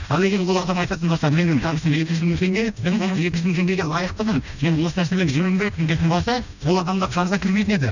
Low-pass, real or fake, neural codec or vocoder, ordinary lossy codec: 7.2 kHz; fake; codec, 16 kHz, 1 kbps, FreqCodec, smaller model; none